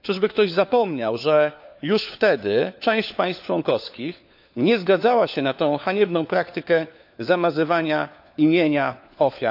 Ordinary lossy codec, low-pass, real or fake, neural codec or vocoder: AAC, 48 kbps; 5.4 kHz; fake; codec, 16 kHz, 4 kbps, FunCodec, trained on LibriTTS, 50 frames a second